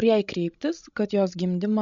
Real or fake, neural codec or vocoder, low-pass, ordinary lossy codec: fake; codec, 16 kHz, 16 kbps, FreqCodec, larger model; 7.2 kHz; MP3, 48 kbps